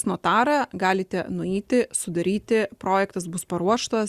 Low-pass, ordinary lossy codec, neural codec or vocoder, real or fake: 14.4 kHz; Opus, 64 kbps; none; real